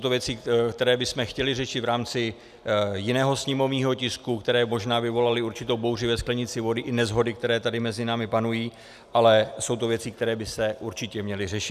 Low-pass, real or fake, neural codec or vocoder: 14.4 kHz; real; none